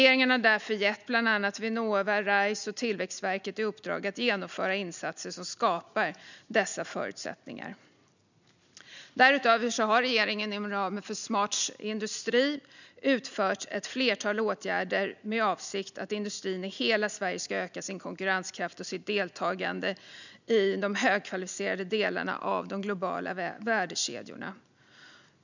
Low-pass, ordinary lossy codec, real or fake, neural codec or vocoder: 7.2 kHz; none; real; none